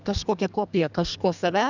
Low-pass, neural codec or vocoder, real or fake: 7.2 kHz; codec, 32 kHz, 1.9 kbps, SNAC; fake